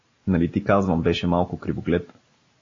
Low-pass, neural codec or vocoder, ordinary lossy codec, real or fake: 7.2 kHz; none; AAC, 32 kbps; real